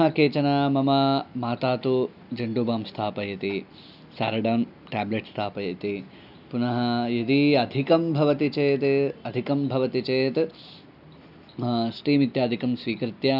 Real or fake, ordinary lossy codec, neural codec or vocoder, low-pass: real; none; none; 5.4 kHz